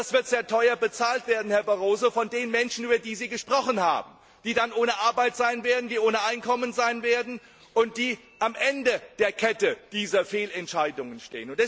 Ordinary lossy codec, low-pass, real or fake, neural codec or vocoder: none; none; real; none